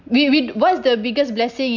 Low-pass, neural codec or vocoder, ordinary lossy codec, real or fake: 7.2 kHz; none; none; real